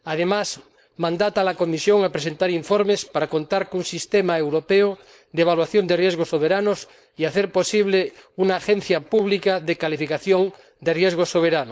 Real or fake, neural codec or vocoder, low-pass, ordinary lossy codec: fake; codec, 16 kHz, 4.8 kbps, FACodec; none; none